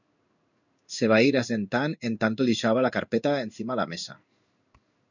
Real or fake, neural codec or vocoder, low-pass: fake; codec, 16 kHz in and 24 kHz out, 1 kbps, XY-Tokenizer; 7.2 kHz